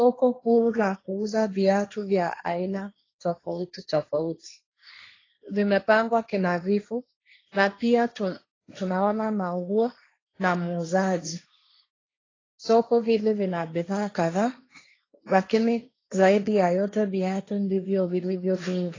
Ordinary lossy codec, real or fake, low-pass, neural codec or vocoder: AAC, 32 kbps; fake; 7.2 kHz; codec, 16 kHz, 1.1 kbps, Voila-Tokenizer